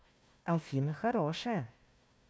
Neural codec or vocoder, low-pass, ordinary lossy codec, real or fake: codec, 16 kHz, 1 kbps, FunCodec, trained on Chinese and English, 50 frames a second; none; none; fake